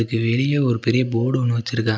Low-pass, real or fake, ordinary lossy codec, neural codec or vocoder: none; real; none; none